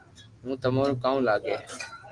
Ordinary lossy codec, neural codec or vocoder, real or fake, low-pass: Opus, 24 kbps; none; real; 10.8 kHz